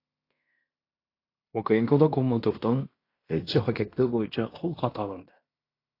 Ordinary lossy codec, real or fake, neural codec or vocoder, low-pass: AAC, 32 kbps; fake; codec, 16 kHz in and 24 kHz out, 0.9 kbps, LongCat-Audio-Codec, fine tuned four codebook decoder; 5.4 kHz